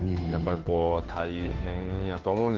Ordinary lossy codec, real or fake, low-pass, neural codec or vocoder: Opus, 16 kbps; fake; 7.2 kHz; codec, 16 kHz, 2 kbps, FunCodec, trained on Chinese and English, 25 frames a second